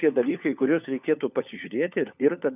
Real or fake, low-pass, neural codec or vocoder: fake; 3.6 kHz; codec, 16 kHz, 4 kbps, FunCodec, trained on LibriTTS, 50 frames a second